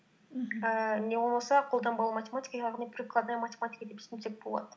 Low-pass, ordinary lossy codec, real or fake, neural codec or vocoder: none; none; real; none